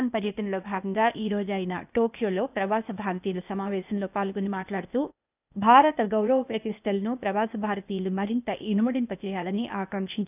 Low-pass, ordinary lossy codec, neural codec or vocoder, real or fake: 3.6 kHz; none; codec, 16 kHz, 0.8 kbps, ZipCodec; fake